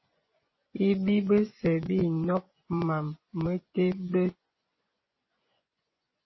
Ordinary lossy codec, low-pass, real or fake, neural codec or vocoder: MP3, 24 kbps; 7.2 kHz; fake; vocoder, 24 kHz, 100 mel bands, Vocos